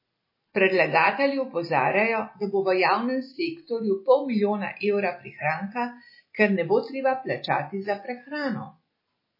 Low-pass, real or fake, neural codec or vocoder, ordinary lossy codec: 5.4 kHz; real; none; MP3, 24 kbps